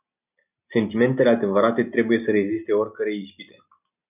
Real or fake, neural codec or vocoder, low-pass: real; none; 3.6 kHz